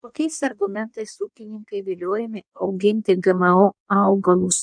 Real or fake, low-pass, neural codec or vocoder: fake; 9.9 kHz; codec, 16 kHz in and 24 kHz out, 1.1 kbps, FireRedTTS-2 codec